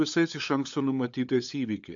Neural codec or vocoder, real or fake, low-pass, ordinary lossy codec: codec, 16 kHz, 8 kbps, FunCodec, trained on LibriTTS, 25 frames a second; fake; 7.2 kHz; AAC, 64 kbps